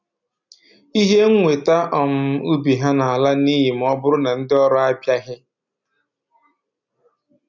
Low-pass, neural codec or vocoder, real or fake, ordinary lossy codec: 7.2 kHz; none; real; none